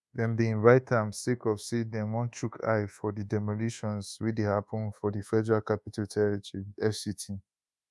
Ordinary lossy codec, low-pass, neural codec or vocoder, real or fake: none; none; codec, 24 kHz, 1.2 kbps, DualCodec; fake